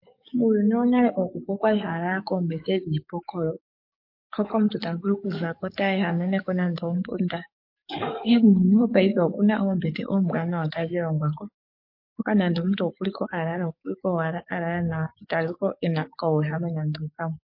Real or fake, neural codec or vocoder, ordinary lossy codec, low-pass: fake; codec, 44.1 kHz, 7.8 kbps, DAC; MP3, 32 kbps; 5.4 kHz